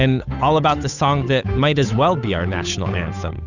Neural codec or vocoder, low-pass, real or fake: codec, 16 kHz, 8 kbps, FunCodec, trained on Chinese and English, 25 frames a second; 7.2 kHz; fake